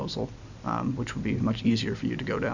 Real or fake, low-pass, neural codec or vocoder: real; 7.2 kHz; none